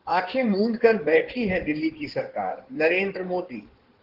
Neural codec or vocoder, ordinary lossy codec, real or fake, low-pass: codec, 16 kHz in and 24 kHz out, 2.2 kbps, FireRedTTS-2 codec; Opus, 16 kbps; fake; 5.4 kHz